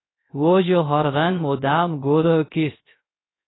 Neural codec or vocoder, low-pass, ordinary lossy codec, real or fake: codec, 16 kHz, 0.3 kbps, FocalCodec; 7.2 kHz; AAC, 16 kbps; fake